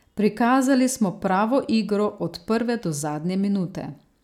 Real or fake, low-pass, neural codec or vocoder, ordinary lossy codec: real; 19.8 kHz; none; none